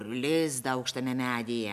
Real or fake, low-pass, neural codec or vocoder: real; 14.4 kHz; none